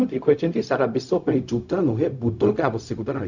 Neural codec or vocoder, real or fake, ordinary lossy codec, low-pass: codec, 16 kHz, 0.4 kbps, LongCat-Audio-Codec; fake; none; 7.2 kHz